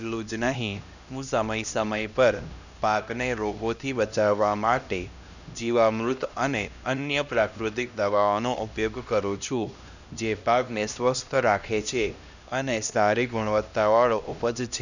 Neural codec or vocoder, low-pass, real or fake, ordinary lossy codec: codec, 16 kHz, 1 kbps, X-Codec, HuBERT features, trained on LibriSpeech; 7.2 kHz; fake; none